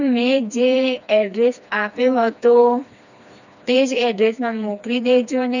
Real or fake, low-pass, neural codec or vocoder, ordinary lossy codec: fake; 7.2 kHz; codec, 16 kHz, 2 kbps, FreqCodec, smaller model; none